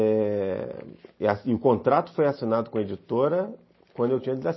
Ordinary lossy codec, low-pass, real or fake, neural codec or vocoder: MP3, 24 kbps; 7.2 kHz; real; none